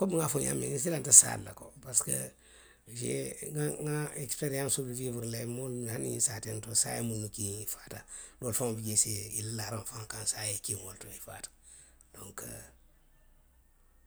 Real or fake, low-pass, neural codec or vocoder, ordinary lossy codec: real; none; none; none